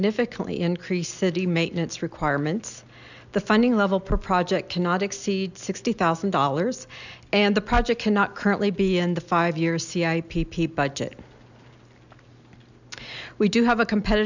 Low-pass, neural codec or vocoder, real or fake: 7.2 kHz; none; real